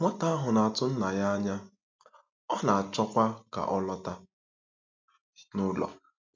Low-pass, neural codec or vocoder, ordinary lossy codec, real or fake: 7.2 kHz; none; MP3, 64 kbps; real